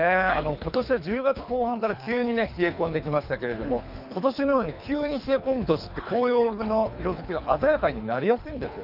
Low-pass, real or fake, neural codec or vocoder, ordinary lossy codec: 5.4 kHz; fake; codec, 24 kHz, 3 kbps, HILCodec; none